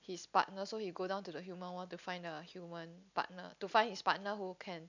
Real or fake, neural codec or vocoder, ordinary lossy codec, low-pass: real; none; none; 7.2 kHz